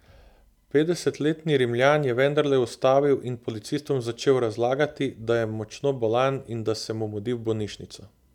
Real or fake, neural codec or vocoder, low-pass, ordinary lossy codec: real; none; 19.8 kHz; none